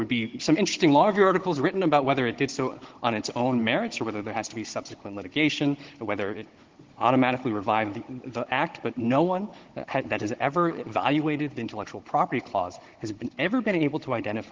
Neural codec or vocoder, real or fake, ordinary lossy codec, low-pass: codec, 16 kHz, 16 kbps, FunCodec, trained on Chinese and English, 50 frames a second; fake; Opus, 16 kbps; 7.2 kHz